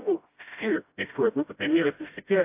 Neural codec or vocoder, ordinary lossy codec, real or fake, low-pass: codec, 16 kHz, 0.5 kbps, FreqCodec, smaller model; AAC, 24 kbps; fake; 3.6 kHz